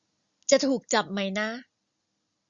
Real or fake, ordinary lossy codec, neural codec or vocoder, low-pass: real; Opus, 64 kbps; none; 7.2 kHz